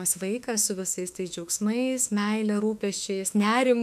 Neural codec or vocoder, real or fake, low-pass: autoencoder, 48 kHz, 32 numbers a frame, DAC-VAE, trained on Japanese speech; fake; 14.4 kHz